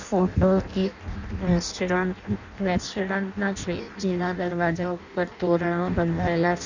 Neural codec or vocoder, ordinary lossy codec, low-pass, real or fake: codec, 16 kHz in and 24 kHz out, 0.6 kbps, FireRedTTS-2 codec; none; 7.2 kHz; fake